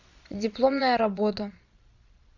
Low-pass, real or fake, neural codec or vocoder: 7.2 kHz; fake; vocoder, 22.05 kHz, 80 mel bands, Vocos